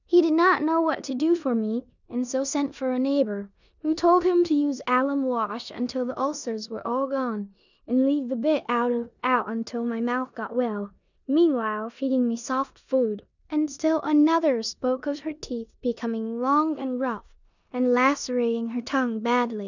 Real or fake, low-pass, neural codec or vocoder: fake; 7.2 kHz; codec, 16 kHz in and 24 kHz out, 0.9 kbps, LongCat-Audio-Codec, four codebook decoder